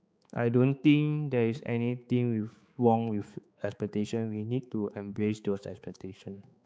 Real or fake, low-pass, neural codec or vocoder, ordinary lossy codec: fake; none; codec, 16 kHz, 4 kbps, X-Codec, HuBERT features, trained on balanced general audio; none